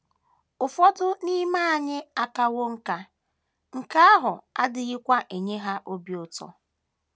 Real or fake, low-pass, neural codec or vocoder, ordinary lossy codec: real; none; none; none